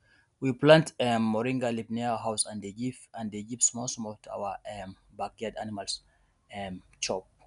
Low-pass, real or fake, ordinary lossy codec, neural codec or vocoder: 10.8 kHz; real; none; none